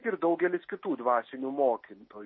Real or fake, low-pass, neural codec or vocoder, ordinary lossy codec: real; 7.2 kHz; none; MP3, 24 kbps